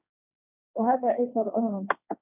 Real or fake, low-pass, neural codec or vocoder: fake; 3.6 kHz; codec, 16 kHz, 1.1 kbps, Voila-Tokenizer